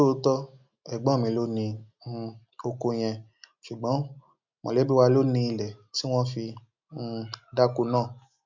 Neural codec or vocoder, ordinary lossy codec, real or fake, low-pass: none; MP3, 64 kbps; real; 7.2 kHz